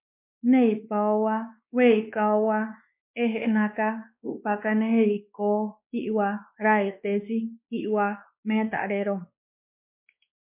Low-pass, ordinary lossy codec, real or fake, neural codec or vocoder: 3.6 kHz; MP3, 32 kbps; fake; codec, 16 kHz, 2 kbps, X-Codec, WavLM features, trained on Multilingual LibriSpeech